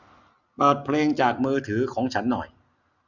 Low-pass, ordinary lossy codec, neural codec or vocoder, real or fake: 7.2 kHz; none; none; real